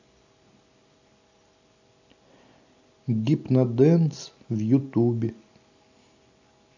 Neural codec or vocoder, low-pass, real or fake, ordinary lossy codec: none; 7.2 kHz; real; AAC, 48 kbps